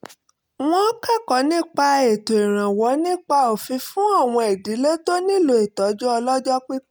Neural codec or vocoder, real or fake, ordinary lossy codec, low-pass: none; real; none; none